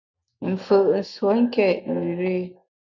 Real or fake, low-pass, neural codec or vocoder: real; 7.2 kHz; none